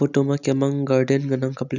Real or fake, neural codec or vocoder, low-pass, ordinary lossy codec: real; none; 7.2 kHz; none